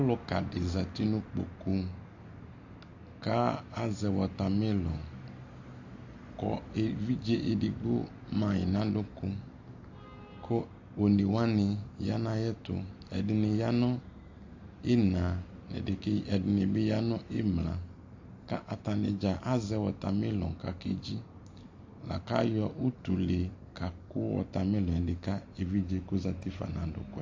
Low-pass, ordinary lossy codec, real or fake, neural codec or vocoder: 7.2 kHz; AAC, 48 kbps; real; none